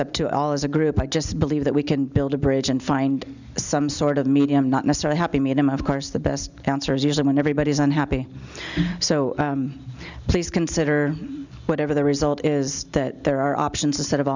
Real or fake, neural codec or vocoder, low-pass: real; none; 7.2 kHz